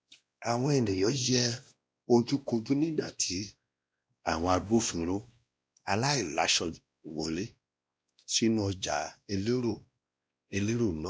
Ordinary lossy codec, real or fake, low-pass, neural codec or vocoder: none; fake; none; codec, 16 kHz, 1 kbps, X-Codec, WavLM features, trained on Multilingual LibriSpeech